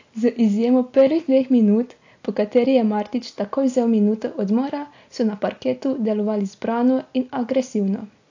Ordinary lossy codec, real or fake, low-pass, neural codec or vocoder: AAC, 48 kbps; real; 7.2 kHz; none